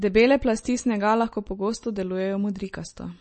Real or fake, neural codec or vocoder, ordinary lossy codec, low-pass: real; none; MP3, 32 kbps; 10.8 kHz